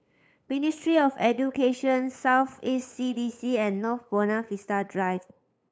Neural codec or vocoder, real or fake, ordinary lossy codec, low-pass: codec, 16 kHz, 8 kbps, FunCodec, trained on LibriTTS, 25 frames a second; fake; none; none